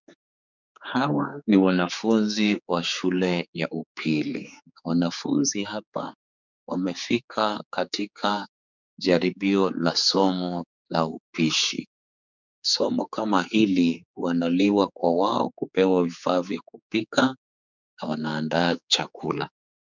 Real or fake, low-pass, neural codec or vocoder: fake; 7.2 kHz; codec, 16 kHz, 4 kbps, X-Codec, HuBERT features, trained on general audio